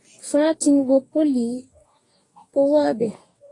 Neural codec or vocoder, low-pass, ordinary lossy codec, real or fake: codec, 44.1 kHz, 2.6 kbps, DAC; 10.8 kHz; AAC, 32 kbps; fake